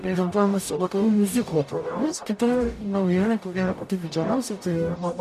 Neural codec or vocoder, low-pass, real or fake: codec, 44.1 kHz, 0.9 kbps, DAC; 14.4 kHz; fake